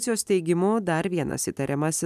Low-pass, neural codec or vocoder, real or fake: 14.4 kHz; none; real